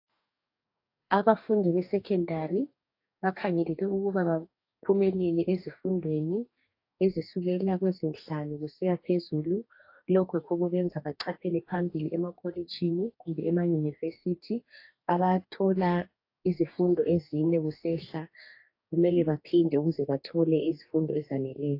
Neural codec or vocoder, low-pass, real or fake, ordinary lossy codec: codec, 44.1 kHz, 2.6 kbps, DAC; 5.4 kHz; fake; AAC, 32 kbps